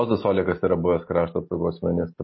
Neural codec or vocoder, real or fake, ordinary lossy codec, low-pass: none; real; MP3, 24 kbps; 7.2 kHz